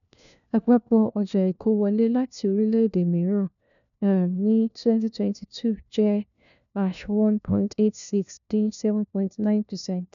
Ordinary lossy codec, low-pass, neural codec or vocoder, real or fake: none; 7.2 kHz; codec, 16 kHz, 1 kbps, FunCodec, trained on LibriTTS, 50 frames a second; fake